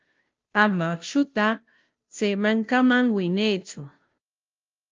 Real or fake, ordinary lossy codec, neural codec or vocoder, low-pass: fake; Opus, 24 kbps; codec, 16 kHz, 0.5 kbps, FunCodec, trained on Chinese and English, 25 frames a second; 7.2 kHz